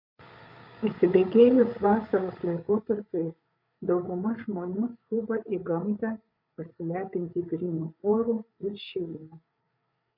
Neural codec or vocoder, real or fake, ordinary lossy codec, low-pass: codec, 16 kHz, 8 kbps, FreqCodec, larger model; fake; MP3, 48 kbps; 5.4 kHz